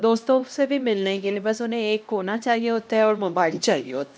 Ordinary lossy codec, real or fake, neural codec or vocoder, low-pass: none; fake; codec, 16 kHz, 1 kbps, X-Codec, HuBERT features, trained on LibriSpeech; none